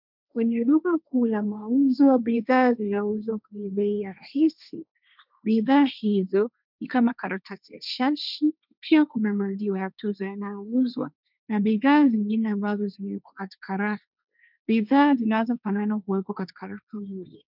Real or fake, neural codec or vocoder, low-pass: fake; codec, 16 kHz, 1.1 kbps, Voila-Tokenizer; 5.4 kHz